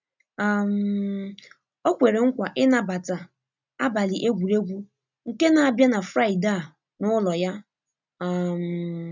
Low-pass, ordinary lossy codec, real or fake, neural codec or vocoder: 7.2 kHz; none; real; none